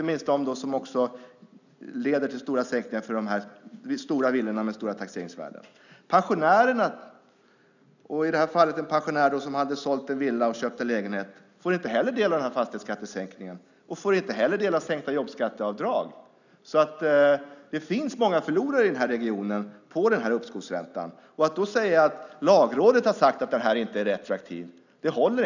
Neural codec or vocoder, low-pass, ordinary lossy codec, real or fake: none; 7.2 kHz; none; real